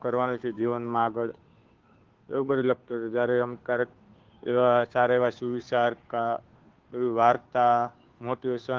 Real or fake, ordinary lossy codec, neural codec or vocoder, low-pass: fake; Opus, 16 kbps; codec, 16 kHz, 2 kbps, FunCodec, trained on Chinese and English, 25 frames a second; 7.2 kHz